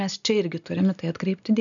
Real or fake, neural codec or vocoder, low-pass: real; none; 7.2 kHz